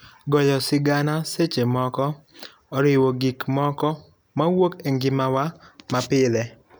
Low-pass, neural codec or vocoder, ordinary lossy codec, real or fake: none; none; none; real